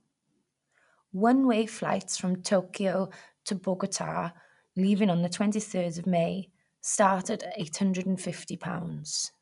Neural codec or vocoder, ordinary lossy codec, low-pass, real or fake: none; none; 10.8 kHz; real